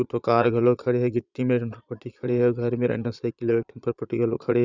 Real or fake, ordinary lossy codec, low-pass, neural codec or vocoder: fake; none; 7.2 kHz; vocoder, 22.05 kHz, 80 mel bands, Vocos